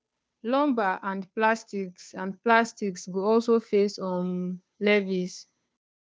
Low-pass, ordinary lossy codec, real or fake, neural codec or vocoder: none; none; fake; codec, 16 kHz, 2 kbps, FunCodec, trained on Chinese and English, 25 frames a second